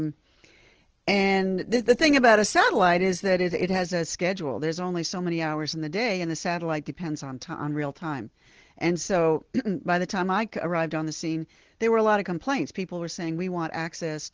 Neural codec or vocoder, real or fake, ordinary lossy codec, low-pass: none; real; Opus, 16 kbps; 7.2 kHz